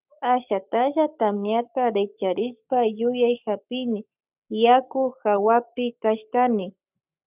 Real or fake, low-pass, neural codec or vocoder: fake; 3.6 kHz; codec, 44.1 kHz, 7.8 kbps, Pupu-Codec